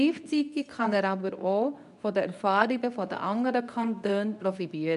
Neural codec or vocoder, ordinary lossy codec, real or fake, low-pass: codec, 24 kHz, 0.9 kbps, WavTokenizer, medium speech release version 2; none; fake; 10.8 kHz